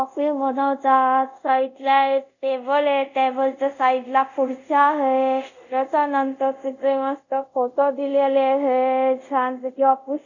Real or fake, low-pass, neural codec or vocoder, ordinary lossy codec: fake; 7.2 kHz; codec, 24 kHz, 0.5 kbps, DualCodec; none